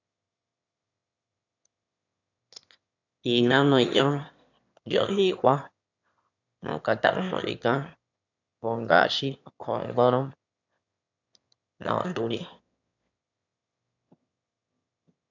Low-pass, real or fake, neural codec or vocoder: 7.2 kHz; fake; autoencoder, 22.05 kHz, a latent of 192 numbers a frame, VITS, trained on one speaker